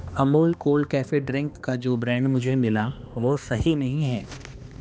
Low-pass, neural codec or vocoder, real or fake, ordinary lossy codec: none; codec, 16 kHz, 2 kbps, X-Codec, HuBERT features, trained on balanced general audio; fake; none